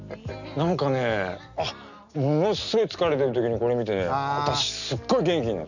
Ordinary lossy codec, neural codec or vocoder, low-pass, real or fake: none; none; 7.2 kHz; real